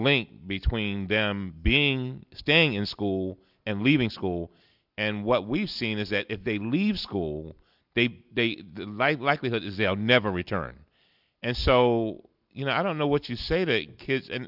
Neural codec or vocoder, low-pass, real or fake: none; 5.4 kHz; real